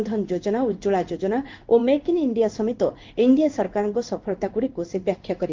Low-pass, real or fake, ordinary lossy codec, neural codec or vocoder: 7.2 kHz; fake; Opus, 24 kbps; codec, 16 kHz in and 24 kHz out, 1 kbps, XY-Tokenizer